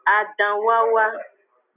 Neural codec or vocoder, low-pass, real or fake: none; 3.6 kHz; real